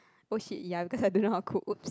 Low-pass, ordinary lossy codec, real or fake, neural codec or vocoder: none; none; real; none